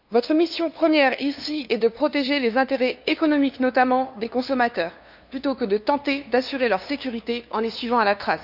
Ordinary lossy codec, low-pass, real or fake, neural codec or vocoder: none; 5.4 kHz; fake; codec, 16 kHz, 2 kbps, FunCodec, trained on LibriTTS, 25 frames a second